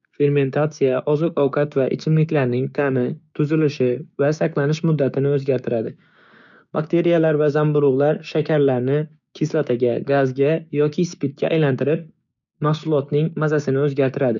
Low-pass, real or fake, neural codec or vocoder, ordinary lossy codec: 7.2 kHz; fake; codec, 16 kHz, 6 kbps, DAC; none